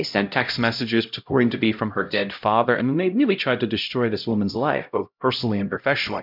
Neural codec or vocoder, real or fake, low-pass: codec, 16 kHz, 0.5 kbps, X-Codec, HuBERT features, trained on LibriSpeech; fake; 5.4 kHz